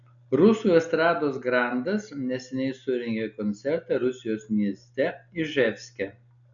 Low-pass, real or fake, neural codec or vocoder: 7.2 kHz; real; none